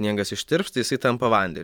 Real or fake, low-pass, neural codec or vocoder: fake; 19.8 kHz; vocoder, 44.1 kHz, 128 mel bands every 512 samples, BigVGAN v2